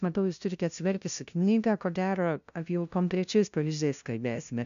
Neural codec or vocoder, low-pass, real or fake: codec, 16 kHz, 0.5 kbps, FunCodec, trained on LibriTTS, 25 frames a second; 7.2 kHz; fake